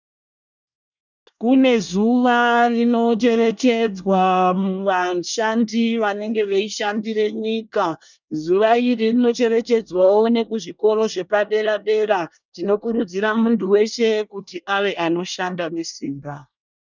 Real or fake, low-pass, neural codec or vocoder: fake; 7.2 kHz; codec, 24 kHz, 1 kbps, SNAC